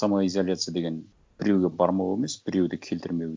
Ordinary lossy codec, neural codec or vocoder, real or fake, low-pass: none; none; real; none